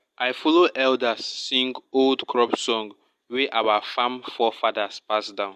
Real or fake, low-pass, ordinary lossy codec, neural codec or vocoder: real; 14.4 kHz; MP3, 64 kbps; none